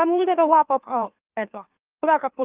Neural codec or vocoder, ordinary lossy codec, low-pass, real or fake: autoencoder, 44.1 kHz, a latent of 192 numbers a frame, MeloTTS; Opus, 24 kbps; 3.6 kHz; fake